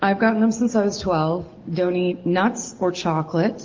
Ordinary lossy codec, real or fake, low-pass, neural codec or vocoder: Opus, 32 kbps; real; 7.2 kHz; none